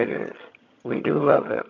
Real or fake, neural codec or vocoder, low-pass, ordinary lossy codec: fake; vocoder, 22.05 kHz, 80 mel bands, HiFi-GAN; 7.2 kHz; MP3, 48 kbps